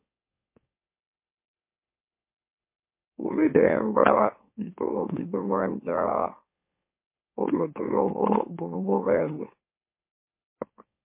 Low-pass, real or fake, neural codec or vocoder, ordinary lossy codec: 3.6 kHz; fake; autoencoder, 44.1 kHz, a latent of 192 numbers a frame, MeloTTS; MP3, 24 kbps